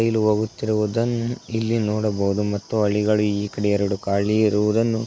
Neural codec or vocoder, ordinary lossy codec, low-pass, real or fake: none; none; none; real